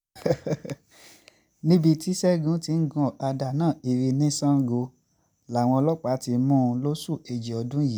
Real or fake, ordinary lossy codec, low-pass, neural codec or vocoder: real; none; none; none